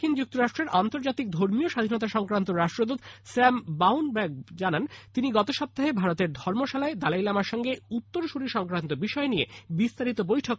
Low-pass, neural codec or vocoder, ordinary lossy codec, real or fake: none; none; none; real